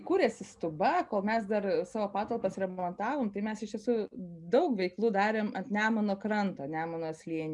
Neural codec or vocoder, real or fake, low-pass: none; real; 10.8 kHz